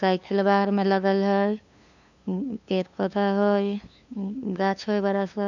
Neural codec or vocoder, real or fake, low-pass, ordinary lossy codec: codec, 16 kHz, 2 kbps, FunCodec, trained on LibriTTS, 25 frames a second; fake; 7.2 kHz; none